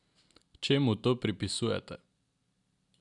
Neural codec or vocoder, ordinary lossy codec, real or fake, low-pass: none; none; real; 10.8 kHz